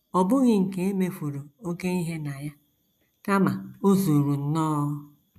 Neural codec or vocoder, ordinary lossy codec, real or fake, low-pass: none; none; real; 14.4 kHz